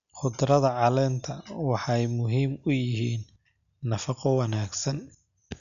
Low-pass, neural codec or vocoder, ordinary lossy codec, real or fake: 7.2 kHz; none; none; real